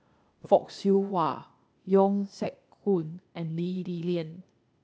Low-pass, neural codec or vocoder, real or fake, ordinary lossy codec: none; codec, 16 kHz, 0.8 kbps, ZipCodec; fake; none